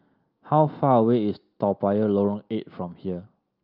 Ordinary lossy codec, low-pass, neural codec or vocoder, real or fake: Opus, 24 kbps; 5.4 kHz; none; real